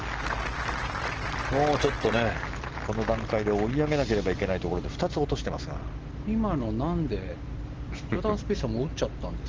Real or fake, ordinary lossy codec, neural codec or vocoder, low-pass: real; Opus, 16 kbps; none; 7.2 kHz